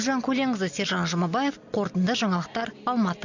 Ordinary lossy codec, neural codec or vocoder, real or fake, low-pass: none; none; real; 7.2 kHz